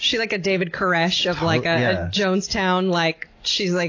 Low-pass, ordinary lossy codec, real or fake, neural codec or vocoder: 7.2 kHz; AAC, 32 kbps; real; none